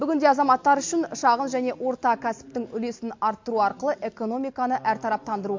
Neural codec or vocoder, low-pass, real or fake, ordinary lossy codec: none; 7.2 kHz; real; MP3, 48 kbps